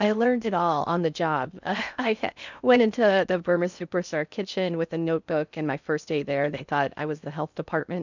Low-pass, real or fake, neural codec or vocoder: 7.2 kHz; fake; codec, 16 kHz in and 24 kHz out, 0.6 kbps, FocalCodec, streaming, 4096 codes